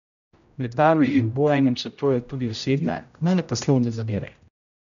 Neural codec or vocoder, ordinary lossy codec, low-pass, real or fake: codec, 16 kHz, 0.5 kbps, X-Codec, HuBERT features, trained on general audio; none; 7.2 kHz; fake